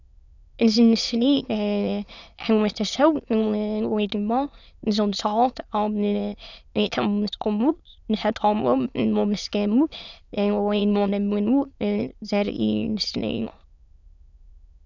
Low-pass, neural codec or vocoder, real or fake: 7.2 kHz; autoencoder, 22.05 kHz, a latent of 192 numbers a frame, VITS, trained on many speakers; fake